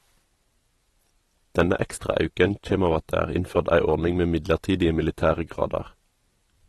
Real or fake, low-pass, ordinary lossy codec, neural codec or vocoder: real; 19.8 kHz; AAC, 32 kbps; none